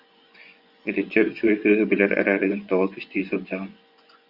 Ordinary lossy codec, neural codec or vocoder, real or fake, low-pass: Opus, 64 kbps; vocoder, 24 kHz, 100 mel bands, Vocos; fake; 5.4 kHz